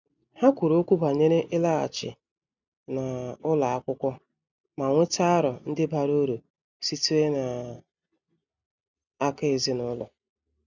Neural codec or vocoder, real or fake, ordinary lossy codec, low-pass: none; real; none; 7.2 kHz